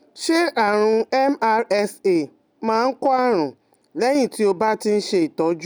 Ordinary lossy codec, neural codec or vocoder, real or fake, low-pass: none; none; real; none